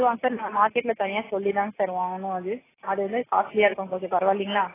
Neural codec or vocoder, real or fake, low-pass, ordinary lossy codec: none; real; 3.6 kHz; AAC, 16 kbps